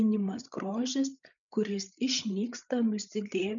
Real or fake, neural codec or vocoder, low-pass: fake; codec, 16 kHz, 8 kbps, FreqCodec, larger model; 7.2 kHz